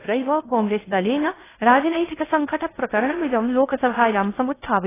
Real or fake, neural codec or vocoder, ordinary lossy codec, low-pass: fake; codec, 16 kHz in and 24 kHz out, 0.6 kbps, FocalCodec, streaming, 2048 codes; AAC, 16 kbps; 3.6 kHz